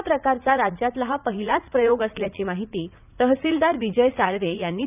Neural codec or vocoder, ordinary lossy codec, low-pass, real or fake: vocoder, 44.1 kHz, 80 mel bands, Vocos; none; 3.6 kHz; fake